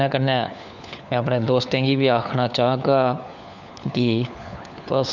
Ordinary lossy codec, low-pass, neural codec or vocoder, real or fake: none; 7.2 kHz; codec, 16 kHz, 4 kbps, FunCodec, trained on LibriTTS, 50 frames a second; fake